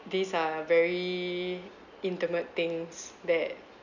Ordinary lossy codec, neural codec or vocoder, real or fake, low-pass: none; none; real; 7.2 kHz